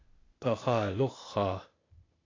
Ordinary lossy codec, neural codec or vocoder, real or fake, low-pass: AAC, 32 kbps; codec, 16 kHz, 0.8 kbps, ZipCodec; fake; 7.2 kHz